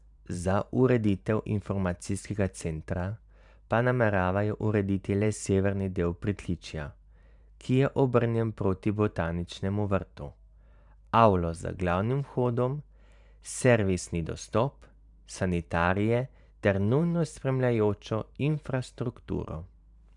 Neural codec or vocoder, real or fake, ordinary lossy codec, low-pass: vocoder, 44.1 kHz, 128 mel bands every 512 samples, BigVGAN v2; fake; none; 10.8 kHz